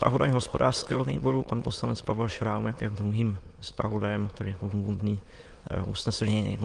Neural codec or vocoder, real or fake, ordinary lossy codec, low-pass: autoencoder, 22.05 kHz, a latent of 192 numbers a frame, VITS, trained on many speakers; fake; Opus, 24 kbps; 9.9 kHz